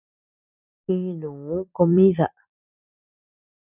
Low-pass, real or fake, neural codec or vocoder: 3.6 kHz; fake; vocoder, 44.1 kHz, 128 mel bands every 256 samples, BigVGAN v2